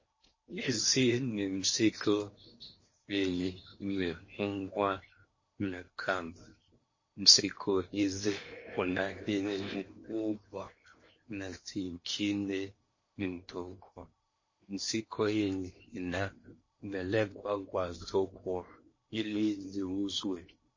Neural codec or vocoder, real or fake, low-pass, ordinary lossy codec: codec, 16 kHz in and 24 kHz out, 0.8 kbps, FocalCodec, streaming, 65536 codes; fake; 7.2 kHz; MP3, 32 kbps